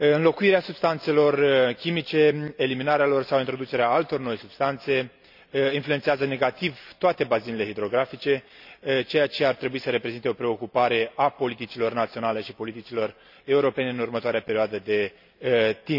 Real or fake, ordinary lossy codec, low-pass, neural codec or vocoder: real; none; 5.4 kHz; none